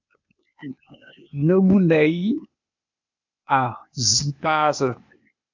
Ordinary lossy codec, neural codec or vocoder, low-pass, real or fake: MP3, 64 kbps; codec, 16 kHz, 0.8 kbps, ZipCodec; 7.2 kHz; fake